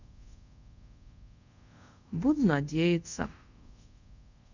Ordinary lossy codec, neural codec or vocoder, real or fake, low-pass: none; codec, 24 kHz, 0.5 kbps, DualCodec; fake; 7.2 kHz